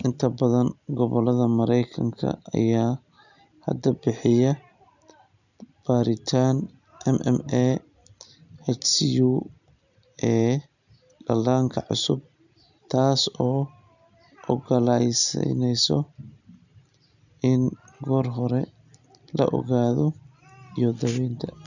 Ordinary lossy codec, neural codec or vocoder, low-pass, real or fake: none; none; 7.2 kHz; real